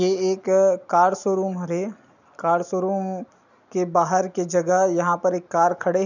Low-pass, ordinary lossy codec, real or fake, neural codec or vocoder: 7.2 kHz; none; real; none